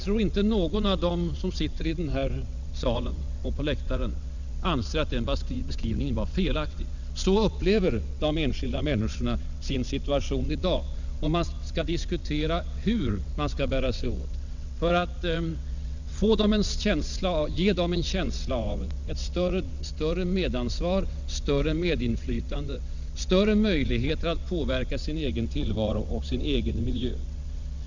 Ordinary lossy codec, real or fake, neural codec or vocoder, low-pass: none; fake; vocoder, 22.05 kHz, 80 mel bands, WaveNeXt; 7.2 kHz